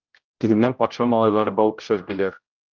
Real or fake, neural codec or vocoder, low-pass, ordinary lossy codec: fake; codec, 16 kHz, 0.5 kbps, X-Codec, HuBERT features, trained on general audio; 7.2 kHz; Opus, 32 kbps